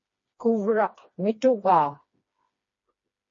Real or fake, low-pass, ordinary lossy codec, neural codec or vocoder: fake; 7.2 kHz; MP3, 32 kbps; codec, 16 kHz, 2 kbps, FreqCodec, smaller model